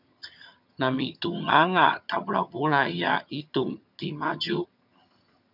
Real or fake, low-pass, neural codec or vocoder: fake; 5.4 kHz; vocoder, 22.05 kHz, 80 mel bands, HiFi-GAN